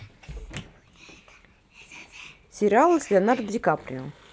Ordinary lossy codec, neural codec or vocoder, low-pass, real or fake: none; none; none; real